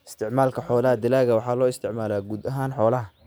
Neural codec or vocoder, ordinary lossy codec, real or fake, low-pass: none; none; real; none